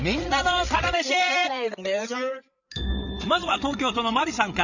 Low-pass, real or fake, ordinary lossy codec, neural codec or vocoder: 7.2 kHz; fake; none; codec, 16 kHz, 8 kbps, FreqCodec, larger model